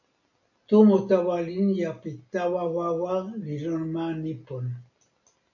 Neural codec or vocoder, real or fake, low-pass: none; real; 7.2 kHz